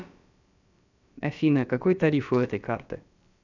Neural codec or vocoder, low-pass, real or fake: codec, 16 kHz, about 1 kbps, DyCAST, with the encoder's durations; 7.2 kHz; fake